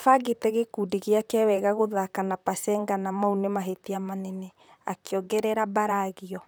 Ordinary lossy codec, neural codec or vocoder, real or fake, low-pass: none; vocoder, 44.1 kHz, 128 mel bands, Pupu-Vocoder; fake; none